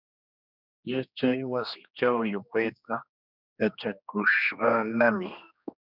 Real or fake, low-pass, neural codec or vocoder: fake; 5.4 kHz; codec, 16 kHz, 2 kbps, X-Codec, HuBERT features, trained on general audio